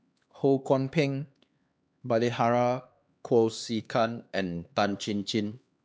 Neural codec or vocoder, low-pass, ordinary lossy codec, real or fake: codec, 16 kHz, 2 kbps, X-Codec, HuBERT features, trained on LibriSpeech; none; none; fake